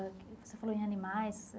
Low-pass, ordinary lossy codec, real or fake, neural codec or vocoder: none; none; real; none